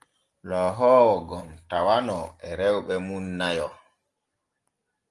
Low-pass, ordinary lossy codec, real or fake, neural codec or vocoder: 10.8 kHz; Opus, 24 kbps; real; none